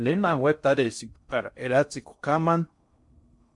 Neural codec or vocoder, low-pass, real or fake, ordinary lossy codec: codec, 16 kHz in and 24 kHz out, 0.6 kbps, FocalCodec, streaming, 4096 codes; 10.8 kHz; fake; MP3, 64 kbps